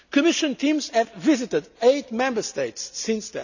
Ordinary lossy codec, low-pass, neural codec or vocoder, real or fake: none; 7.2 kHz; none; real